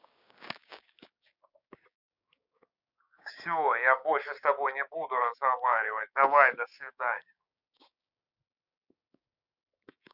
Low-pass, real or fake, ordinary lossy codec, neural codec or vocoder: 5.4 kHz; fake; none; codec, 16 kHz, 6 kbps, DAC